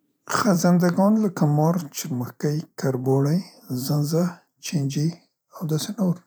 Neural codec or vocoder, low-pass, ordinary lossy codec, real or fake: vocoder, 44.1 kHz, 128 mel bands every 512 samples, BigVGAN v2; none; none; fake